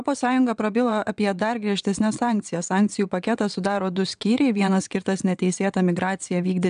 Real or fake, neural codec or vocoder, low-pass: fake; vocoder, 22.05 kHz, 80 mel bands, WaveNeXt; 9.9 kHz